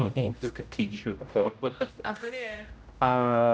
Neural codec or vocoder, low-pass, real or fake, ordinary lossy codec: codec, 16 kHz, 0.5 kbps, X-Codec, HuBERT features, trained on general audio; none; fake; none